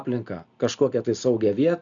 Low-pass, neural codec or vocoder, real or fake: 7.2 kHz; none; real